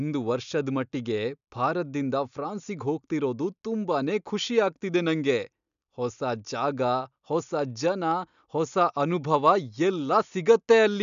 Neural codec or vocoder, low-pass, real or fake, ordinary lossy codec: none; 7.2 kHz; real; none